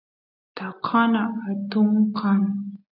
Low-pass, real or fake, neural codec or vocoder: 5.4 kHz; real; none